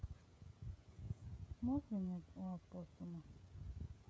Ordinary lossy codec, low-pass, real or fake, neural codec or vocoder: none; none; fake; codec, 16 kHz, 16 kbps, FreqCodec, smaller model